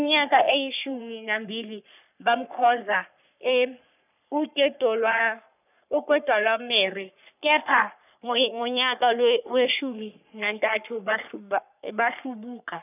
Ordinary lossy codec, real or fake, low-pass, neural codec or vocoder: none; fake; 3.6 kHz; codec, 44.1 kHz, 3.4 kbps, Pupu-Codec